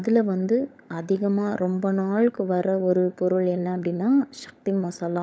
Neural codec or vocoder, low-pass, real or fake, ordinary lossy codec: codec, 16 kHz, 16 kbps, FunCodec, trained on LibriTTS, 50 frames a second; none; fake; none